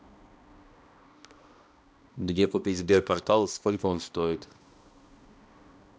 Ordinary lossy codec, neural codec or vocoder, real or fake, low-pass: none; codec, 16 kHz, 1 kbps, X-Codec, HuBERT features, trained on balanced general audio; fake; none